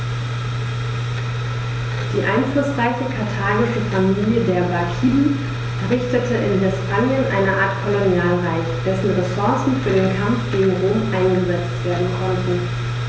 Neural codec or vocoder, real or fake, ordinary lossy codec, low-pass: none; real; none; none